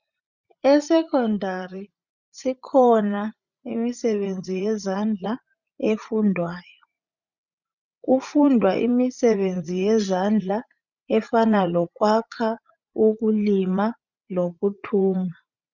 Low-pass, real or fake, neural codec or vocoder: 7.2 kHz; fake; vocoder, 44.1 kHz, 80 mel bands, Vocos